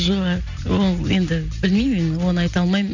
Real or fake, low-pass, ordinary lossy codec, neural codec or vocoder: real; 7.2 kHz; none; none